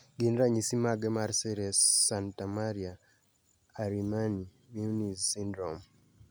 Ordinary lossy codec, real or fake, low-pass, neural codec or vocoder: none; real; none; none